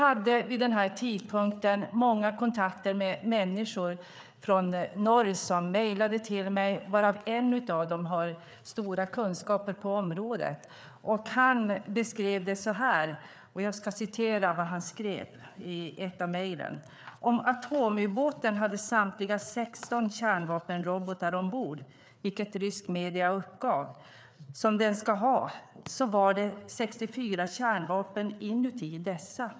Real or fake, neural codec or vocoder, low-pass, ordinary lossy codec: fake; codec, 16 kHz, 4 kbps, FreqCodec, larger model; none; none